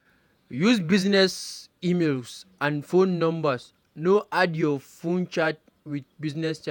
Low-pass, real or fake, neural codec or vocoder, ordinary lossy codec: 19.8 kHz; fake; vocoder, 48 kHz, 128 mel bands, Vocos; none